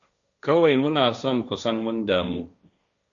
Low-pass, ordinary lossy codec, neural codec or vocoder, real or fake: 7.2 kHz; AAC, 64 kbps; codec, 16 kHz, 1.1 kbps, Voila-Tokenizer; fake